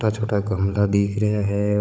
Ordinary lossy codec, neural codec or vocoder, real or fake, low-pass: none; codec, 16 kHz, 16 kbps, FunCodec, trained on Chinese and English, 50 frames a second; fake; none